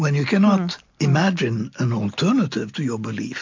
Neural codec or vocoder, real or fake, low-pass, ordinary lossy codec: none; real; 7.2 kHz; MP3, 48 kbps